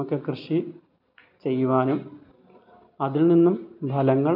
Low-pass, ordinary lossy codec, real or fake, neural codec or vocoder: 5.4 kHz; MP3, 48 kbps; real; none